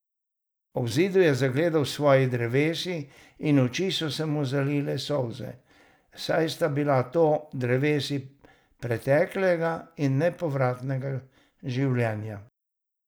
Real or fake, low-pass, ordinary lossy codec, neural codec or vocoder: real; none; none; none